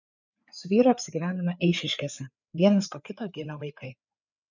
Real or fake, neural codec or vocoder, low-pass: fake; codec, 16 kHz, 4 kbps, FreqCodec, larger model; 7.2 kHz